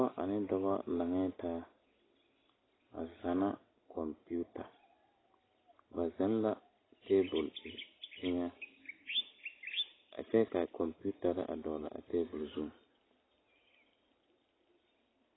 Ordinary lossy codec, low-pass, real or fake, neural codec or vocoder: AAC, 16 kbps; 7.2 kHz; real; none